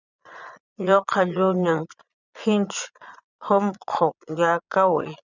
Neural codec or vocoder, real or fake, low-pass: vocoder, 22.05 kHz, 80 mel bands, Vocos; fake; 7.2 kHz